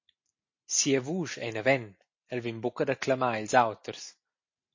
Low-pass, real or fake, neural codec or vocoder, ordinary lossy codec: 7.2 kHz; real; none; MP3, 32 kbps